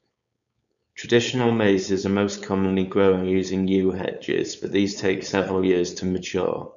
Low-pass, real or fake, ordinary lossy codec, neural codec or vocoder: 7.2 kHz; fake; none; codec, 16 kHz, 4.8 kbps, FACodec